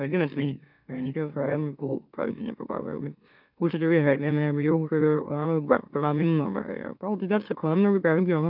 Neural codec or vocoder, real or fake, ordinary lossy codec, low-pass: autoencoder, 44.1 kHz, a latent of 192 numbers a frame, MeloTTS; fake; none; 5.4 kHz